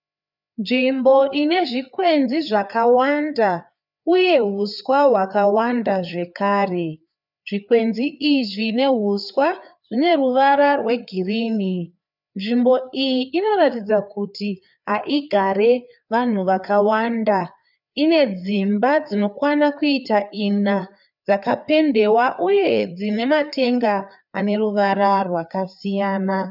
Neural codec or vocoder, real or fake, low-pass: codec, 16 kHz, 4 kbps, FreqCodec, larger model; fake; 5.4 kHz